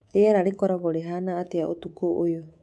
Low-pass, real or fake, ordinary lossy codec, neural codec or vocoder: none; fake; none; codec, 24 kHz, 3.1 kbps, DualCodec